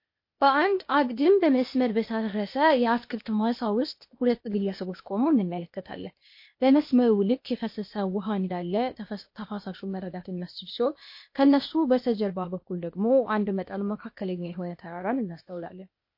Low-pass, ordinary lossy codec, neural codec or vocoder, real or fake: 5.4 kHz; MP3, 32 kbps; codec, 16 kHz, 0.8 kbps, ZipCodec; fake